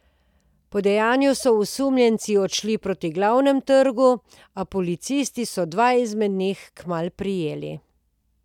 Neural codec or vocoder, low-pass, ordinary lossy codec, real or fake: none; 19.8 kHz; none; real